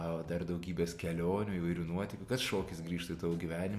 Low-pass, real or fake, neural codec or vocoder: 14.4 kHz; real; none